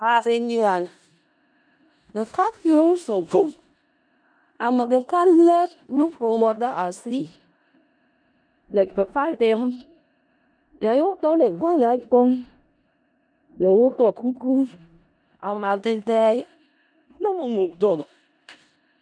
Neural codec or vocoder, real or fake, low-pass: codec, 16 kHz in and 24 kHz out, 0.4 kbps, LongCat-Audio-Codec, four codebook decoder; fake; 9.9 kHz